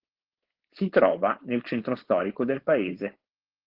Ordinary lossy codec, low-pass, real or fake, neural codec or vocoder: Opus, 16 kbps; 5.4 kHz; fake; codec, 16 kHz, 4.8 kbps, FACodec